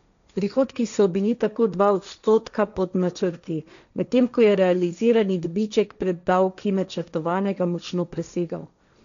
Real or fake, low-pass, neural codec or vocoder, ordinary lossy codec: fake; 7.2 kHz; codec, 16 kHz, 1.1 kbps, Voila-Tokenizer; none